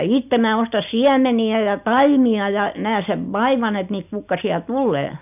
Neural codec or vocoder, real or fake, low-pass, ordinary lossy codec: none; real; 3.6 kHz; none